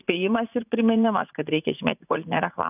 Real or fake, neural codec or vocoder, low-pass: fake; vocoder, 44.1 kHz, 80 mel bands, Vocos; 3.6 kHz